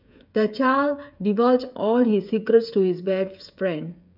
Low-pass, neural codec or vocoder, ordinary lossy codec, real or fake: 5.4 kHz; codec, 16 kHz, 16 kbps, FreqCodec, smaller model; none; fake